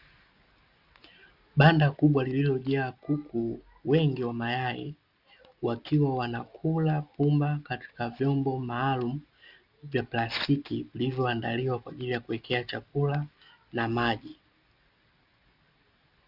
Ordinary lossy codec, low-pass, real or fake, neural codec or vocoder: Opus, 64 kbps; 5.4 kHz; real; none